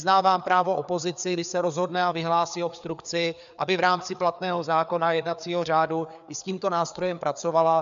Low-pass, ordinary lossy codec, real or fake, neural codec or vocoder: 7.2 kHz; MP3, 96 kbps; fake; codec, 16 kHz, 4 kbps, FreqCodec, larger model